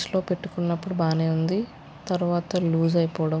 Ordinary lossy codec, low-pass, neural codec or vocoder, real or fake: none; none; none; real